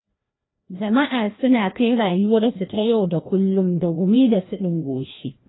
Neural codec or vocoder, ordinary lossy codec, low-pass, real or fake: codec, 16 kHz, 1 kbps, FreqCodec, larger model; AAC, 16 kbps; 7.2 kHz; fake